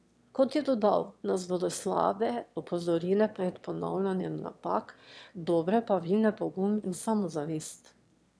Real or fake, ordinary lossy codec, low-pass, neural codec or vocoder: fake; none; none; autoencoder, 22.05 kHz, a latent of 192 numbers a frame, VITS, trained on one speaker